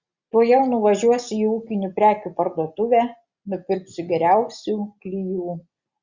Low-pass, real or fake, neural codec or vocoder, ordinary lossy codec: 7.2 kHz; real; none; Opus, 64 kbps